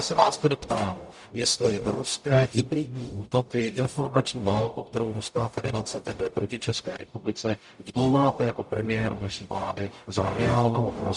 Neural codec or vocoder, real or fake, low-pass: codec, 44.1 kHz, 0.9 kbps, DAC; fake; 10.8 kHz